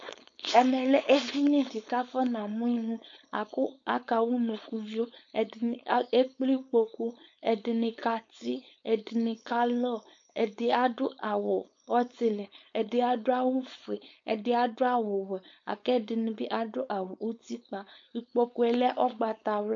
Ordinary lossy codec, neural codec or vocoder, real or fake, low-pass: MP3, 48 kbps; codec, 16 kHz, 4.8 kbps, FACodec; fake; 7.2 kHz